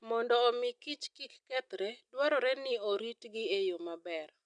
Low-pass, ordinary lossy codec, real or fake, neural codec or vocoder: 9.9 kHz; none; real; none